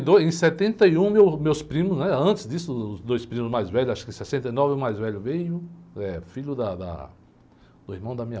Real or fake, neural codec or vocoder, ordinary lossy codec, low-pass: real; none; none; none